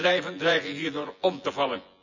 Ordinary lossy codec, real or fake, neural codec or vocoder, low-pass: none; fake; vocoder, 24 kHz, 100 mel bands, Vocos; 7.2 kHz